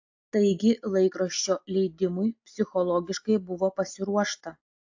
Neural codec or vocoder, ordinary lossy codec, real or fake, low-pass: none; AAC, 48 kbps; real; 7.2 kHz